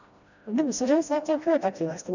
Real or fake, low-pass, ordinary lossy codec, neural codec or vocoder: fake; 7.2 kHz; none; codec, 16 kHz, 1 kbps, FreqCodec, smaller model